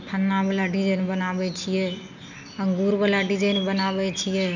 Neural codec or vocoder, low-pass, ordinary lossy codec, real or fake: none; 7.2 kHz; none; real